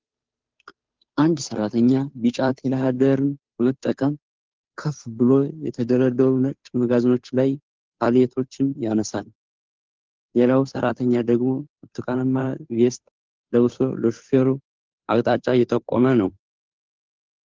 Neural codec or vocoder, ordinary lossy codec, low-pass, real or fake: codec, 16 kHz, 2 kbps, FunCodec, trained on Chinese and English, 25 frames a second; Opus, 32 kbps; 7.2 kHz; fake